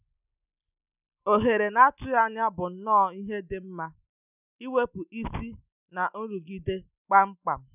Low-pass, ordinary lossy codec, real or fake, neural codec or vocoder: 3.6 kHz; none; real; none